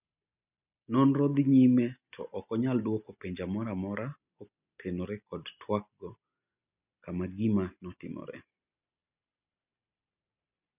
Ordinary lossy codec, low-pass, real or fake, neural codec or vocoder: none; 3.6 kHz; real; none